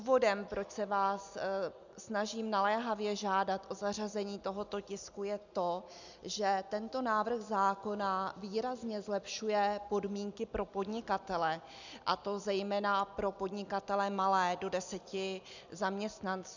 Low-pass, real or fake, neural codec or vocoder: 7.2 kHz; real; none